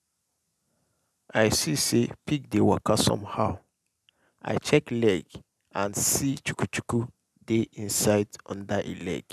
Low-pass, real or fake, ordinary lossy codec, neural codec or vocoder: 14.4 kHz; real; none; none